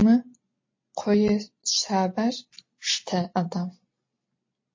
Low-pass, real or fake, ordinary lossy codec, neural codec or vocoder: 7.2 kHz; real; MP3, 32 kbps; none